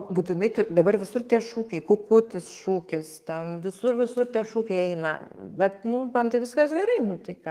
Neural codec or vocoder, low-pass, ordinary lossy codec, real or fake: codec, 32 kHz, 1.9 kbps, SNAC; 14.4 kHz; Opus, 24 kbps; fake